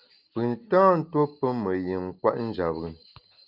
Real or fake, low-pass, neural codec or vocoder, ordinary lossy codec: real; 5.4 kHz; none; Opus, 24 kbps